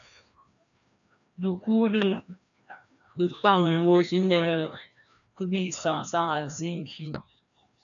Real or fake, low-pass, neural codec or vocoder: fake; 7.2 kHz; codec, 16 kHz, 1 kbps, FreqCodec, larger model